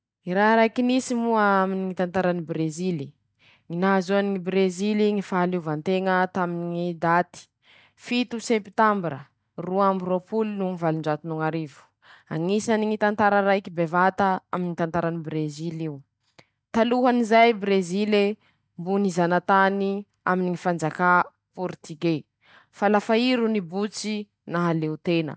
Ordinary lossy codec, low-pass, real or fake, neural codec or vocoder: none; none; real; none